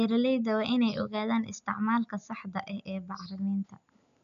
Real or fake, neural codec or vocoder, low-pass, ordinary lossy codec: real; none; 7.2 kHz; none